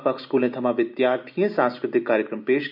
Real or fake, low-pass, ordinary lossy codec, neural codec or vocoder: real; 5.4 kHz; none; none